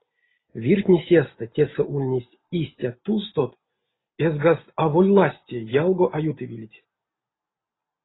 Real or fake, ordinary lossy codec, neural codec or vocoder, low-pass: real; AAC, 16 kbps; none; 7.2 kHz